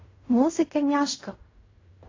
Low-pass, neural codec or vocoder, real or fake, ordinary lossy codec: 7.2 kHz; codec, 16 kHz in and 24 kHz out, 0.4 kbps, LongCat-Audio-Codec, fine tuned four codebook decoder; fake; AAC, 32 kbps